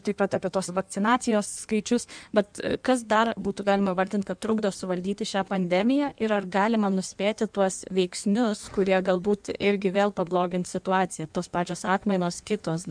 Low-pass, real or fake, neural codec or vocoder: 9.9 kHz; fake; codec, 16 kHz in and 24 kHz out, 1.1 kbps, FireRedTTS-2 codec